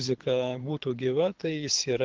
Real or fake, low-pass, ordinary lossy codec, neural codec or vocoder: fake; 7.2 kHz; Opus, 16 kbps; codec, 24 kHz, 6 kbps, HILCodec